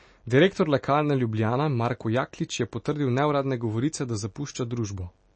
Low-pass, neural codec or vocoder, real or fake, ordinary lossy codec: 9.9 kHz; none; real; MP3, 32 kbps